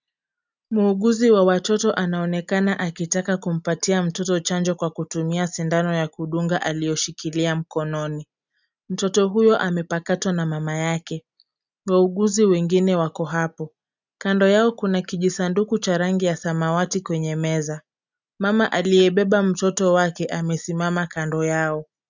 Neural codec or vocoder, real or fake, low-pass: none; real; 7.2 kHz